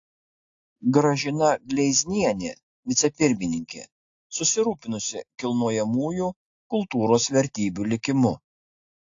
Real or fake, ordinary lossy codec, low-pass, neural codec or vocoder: real; AAC, 48 kbps; 7.2 kHz; none